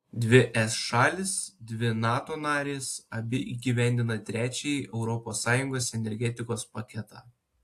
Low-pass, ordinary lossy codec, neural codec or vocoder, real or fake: 14.4 kHz; AAC, 64 kbps; none; real